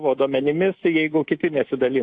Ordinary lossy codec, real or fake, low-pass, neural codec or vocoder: AAC, 48 kbps; fake; 9.9 kHz; codec, 24 kHz, 3.1 kbps, DualCodec